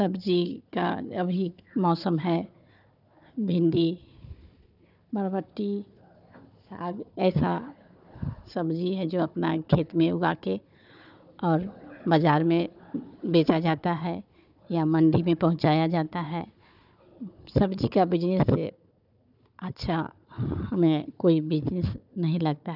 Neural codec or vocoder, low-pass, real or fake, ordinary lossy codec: codec, 16 kHz, 4 kbps, FunCodec, trained on Chinese and English, 50 frames a second; 5.4 kHz; fake; none